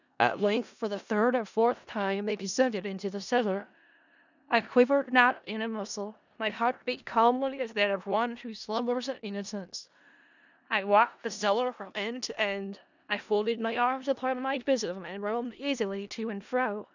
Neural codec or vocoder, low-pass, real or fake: codec, 16 kHz in and 24 kHz out, 0.4 kbps, LongCat-Audio-Codec, four codebook decoder; 7.2 kHz; fake